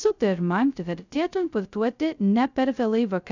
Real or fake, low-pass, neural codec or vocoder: fake; 7.2 kHz; codec, 16 kHz, 0.2 kbps, FocalCodec